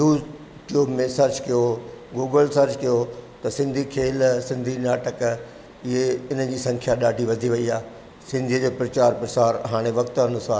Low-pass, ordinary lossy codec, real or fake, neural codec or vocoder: none; none; real; none